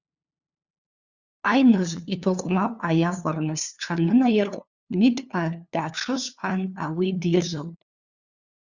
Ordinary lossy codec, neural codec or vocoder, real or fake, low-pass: Opus, 64 kbps; codec, 16 kHz, 2 kbps, FunCodec, trained on LibriTTS, 25 frames a second; fake; 7.2 kHz